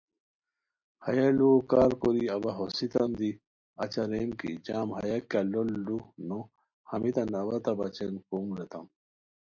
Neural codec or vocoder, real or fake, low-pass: none; real; 7.2 kHz